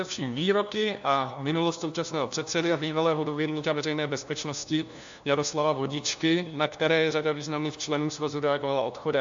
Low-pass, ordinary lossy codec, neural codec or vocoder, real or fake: 7.2 kHz; AAC, 64 kbps; codec, 16 kHz, 1 kbps, FunCodec, trained on LibriTTS, 50 frames a second; fake